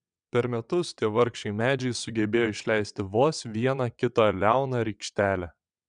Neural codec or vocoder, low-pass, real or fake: vocoder, 22.05 kHz, 80 mel bands, WaveNeXt; 9.9 kHz; fake